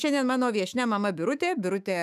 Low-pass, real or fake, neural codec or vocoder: 14.4 kHz; fake; autoencoder, 48 kHz, 128 numbers a frame, DAC-VAE, trained on Japanese speech